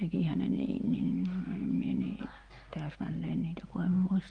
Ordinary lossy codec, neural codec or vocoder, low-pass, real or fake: Opus, 32 kbps; none; 9.9 kHz; real